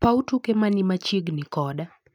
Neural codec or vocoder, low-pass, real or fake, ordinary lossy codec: none; 19.8 kHz; real; none